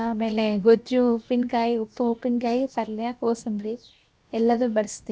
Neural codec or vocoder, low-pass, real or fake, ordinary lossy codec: codec, 16 kHz, 0.7 kbps, FocalCodec; none; fake; none